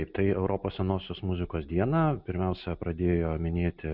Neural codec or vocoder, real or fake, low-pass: none; real; 5.4 kHz